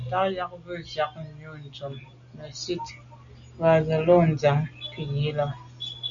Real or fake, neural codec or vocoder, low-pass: real; none; 7.2 kHz